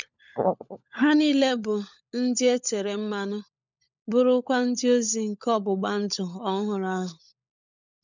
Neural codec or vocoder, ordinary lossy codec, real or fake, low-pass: codec, 16 kHz, 16 kbps, FunCodec, trained on LibriTTS, 50 frames a second; none; fake; 7.2 kHz